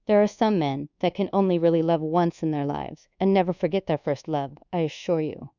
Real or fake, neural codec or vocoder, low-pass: fake; codec, 24 kHz, 1.2 kbps, DualCodec; 7.2 kHz